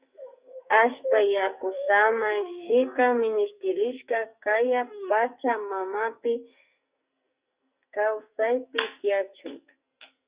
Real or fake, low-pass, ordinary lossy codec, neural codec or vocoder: fake; 3.6 kHz; Opus, 64 kbps; codec, 44.1 kHz, 2.6 kbps, SNAC